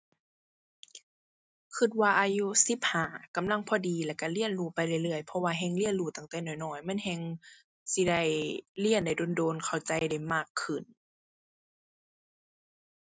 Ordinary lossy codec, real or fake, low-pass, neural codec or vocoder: none; real; none; none